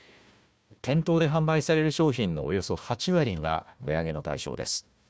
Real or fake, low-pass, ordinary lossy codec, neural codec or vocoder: fake; none; none; codec, 16 kHz, 1 kbps, FunCodec, trained on Chinese and English, 50 frames a second